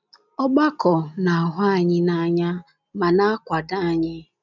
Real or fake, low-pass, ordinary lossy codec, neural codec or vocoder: real; 7.2 kHz; none; none